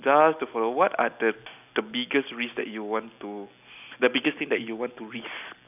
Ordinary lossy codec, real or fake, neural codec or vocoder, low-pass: none; real; none; 3.6 kHz